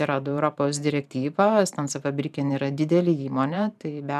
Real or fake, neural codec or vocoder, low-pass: fake; vocoder, 44.1 kHz, 128 mel bands every 512 samples, BigVGAN v2; 14.4 kHz